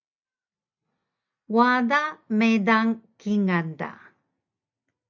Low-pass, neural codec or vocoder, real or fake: 7.2 kHz; none; real